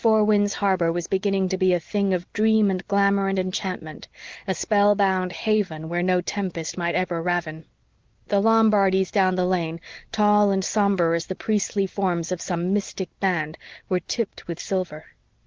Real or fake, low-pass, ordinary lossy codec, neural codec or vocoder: real; 7.2 kHz; Opus, 16 kbps; none